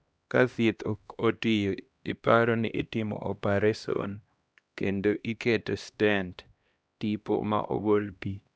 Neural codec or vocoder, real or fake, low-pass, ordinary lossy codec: codec, 16 kHz, 1 kbps, X-Codec, HuBERT features, trained on LibriSpeech; fake; none; none